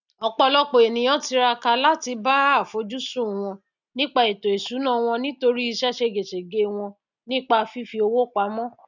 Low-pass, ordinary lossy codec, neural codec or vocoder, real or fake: 7.2 kHz; none; none; real